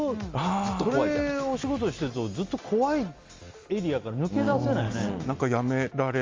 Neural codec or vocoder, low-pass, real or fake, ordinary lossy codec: none; 7.2 kHz; real; Opus, 32 kbps